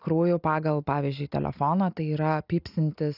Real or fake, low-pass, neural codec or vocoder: real; 5.4 kHz; none